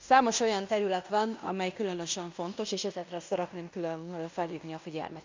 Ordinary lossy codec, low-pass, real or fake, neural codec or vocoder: MP3, 64 kbps; 7.2 kHz; fake; codec, 16 kHz in and 24 kHz out, 0.9 kbps, LongCat-Audio-Codec, fine tuned four codebook decoder